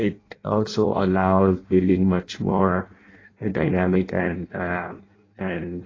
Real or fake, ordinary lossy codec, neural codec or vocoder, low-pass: fake; AAC, 32 kbps; codec, 16 kHz in and 24 kHz out, 0.6 kbps, FireRedTTS-2 codec; 7.2 kHz